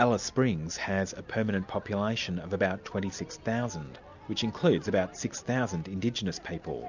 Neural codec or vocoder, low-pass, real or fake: none; 7.2 kHz; real